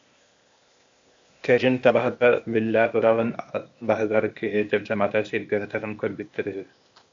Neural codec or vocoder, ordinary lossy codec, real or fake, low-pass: codec, 16 kHz, 0.8 kbps, ZipCodec; AAC, 64 kbps; fake; 7.2 kHz